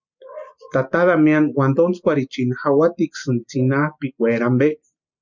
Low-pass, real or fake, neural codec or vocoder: 7.2 kHz; real; none